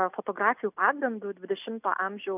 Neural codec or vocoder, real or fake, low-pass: none; real; 3.6 kHz